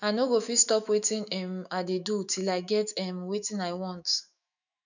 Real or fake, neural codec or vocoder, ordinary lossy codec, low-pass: fake; autoencoder, 48 kHz, 128 numbers a frame, DAC-VAE, trained on Japanese speech; none; 7.2 kHz